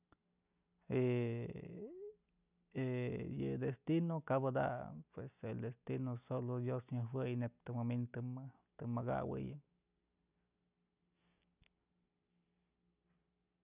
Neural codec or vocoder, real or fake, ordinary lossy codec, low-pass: none; real; none; 3.6 kHz